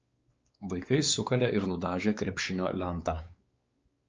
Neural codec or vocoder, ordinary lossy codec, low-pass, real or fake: codec, 16 kHz, 4 kbps, X-Codec, WavLM features, trained on Multilingual LibriSpeech; Opus, 24 kbps; 7.2 kHz; fake